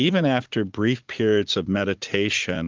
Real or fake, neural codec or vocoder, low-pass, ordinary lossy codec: fake; vocoder, 44.1 kHz, 80 mel bands, Vocos; 7.2 kHz; Opus, 32 kbps